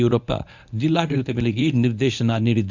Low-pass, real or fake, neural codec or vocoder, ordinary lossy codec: 7.2 kHz; fake; codec, 24 kHz, 0.9 kbps, WavTokenizer, medium speech release version 1; none